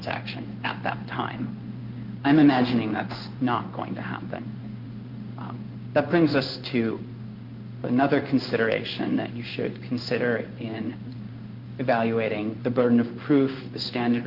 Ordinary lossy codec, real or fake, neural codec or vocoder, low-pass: Opus, 32 kbps; fake; codec, 16 kHz in and 24 kHz out, 1 kbps, XY-Tokenizer; 5.4 kHz